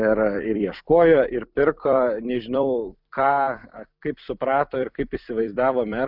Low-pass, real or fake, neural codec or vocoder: 5.4 kHz; fake; vocoder, 44.1 kHz, 128 mel bands every 256 samples, BigVGAN v2